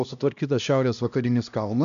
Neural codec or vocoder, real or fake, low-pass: codec, 16 kHz, 1 kbps, X-Codec, HuBERT features, trained on LibriSpeech; fake; 7.2 kHz